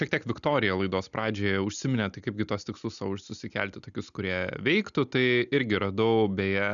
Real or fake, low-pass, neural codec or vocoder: real; 7.2 kHz; none